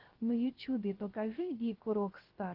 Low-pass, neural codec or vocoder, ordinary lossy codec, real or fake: 5.4 kHz; codec, 16 kHz, 0.3 kbps, FocalCodec; Opus, 16 kbps; fake